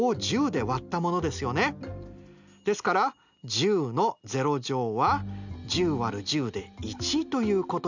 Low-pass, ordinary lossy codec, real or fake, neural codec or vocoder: 7.2 kHz; none; real; none